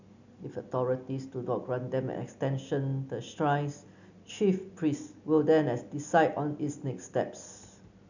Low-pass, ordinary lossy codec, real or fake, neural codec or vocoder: 7.2 kHz; none; real; none